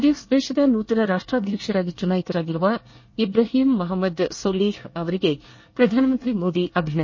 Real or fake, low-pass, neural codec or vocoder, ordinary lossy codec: fake; 7.2 kHz; codec, 24 kHz, 1 kbps, SNAC; MP3, 32 kbps